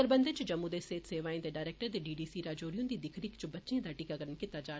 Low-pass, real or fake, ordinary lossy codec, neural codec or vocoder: none; real; none; none